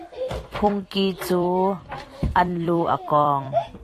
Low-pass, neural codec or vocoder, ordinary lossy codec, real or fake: 14.4 kHz; vocoder, 44.1 kHz, 128 mel bands every 256 samples, BigVGAN v2; AAC, 48 kbps; fake